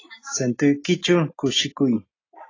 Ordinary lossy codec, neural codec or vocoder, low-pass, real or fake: AAC, 32 kbps; none; 7.2 kHz; real